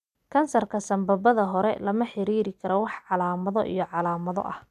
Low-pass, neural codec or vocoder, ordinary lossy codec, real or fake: 14.4 kHz; none; none; real